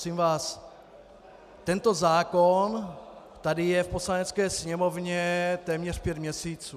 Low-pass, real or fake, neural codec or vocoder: 14.4 kHz; real; none